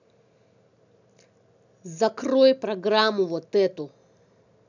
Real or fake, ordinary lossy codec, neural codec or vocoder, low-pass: real; none; none; 7.2 kHz